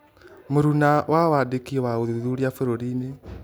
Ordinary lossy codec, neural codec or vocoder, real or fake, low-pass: none; none; real; none